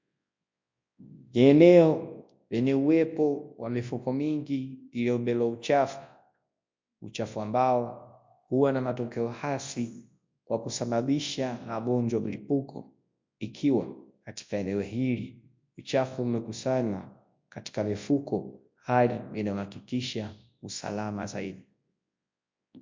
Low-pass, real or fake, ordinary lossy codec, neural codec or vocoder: 7.2 kHz; fake; MP3, 48 kbps; codec, 24 kHz, 0.9 kbps, WavTokenizer, large speech release